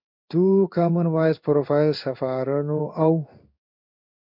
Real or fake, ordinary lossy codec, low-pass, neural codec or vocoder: fake; MP3, 48 kbps; 5.4 kHz; codec, 16 kHz in and 24 kHz out, 1 kbps, XY-Tokenizer